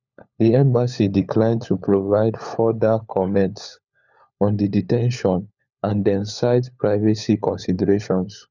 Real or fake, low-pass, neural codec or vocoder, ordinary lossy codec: fake; 7.2 kHz; codec, 16 kHz, 4 kbps, FunCodec, trained on LibriTTS, 50 frames a second; none